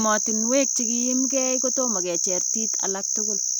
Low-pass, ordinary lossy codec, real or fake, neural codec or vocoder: none; none; real; none